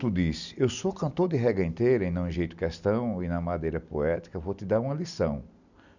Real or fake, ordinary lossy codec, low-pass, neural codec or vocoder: real; none; 7.2 kHz; none